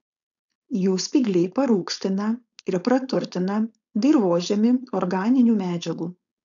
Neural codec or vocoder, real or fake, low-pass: codec, 16 kHz, 4.8 kbps, FACodec; fake; 7.2 kHz